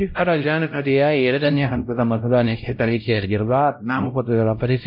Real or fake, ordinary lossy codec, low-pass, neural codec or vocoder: fake; MP3, 32 kbps; 5.4 kHz; codec, 16 kHz, 0.5 kbps, X-Codec, WavLM features, trained on Multilingual LibriSpeech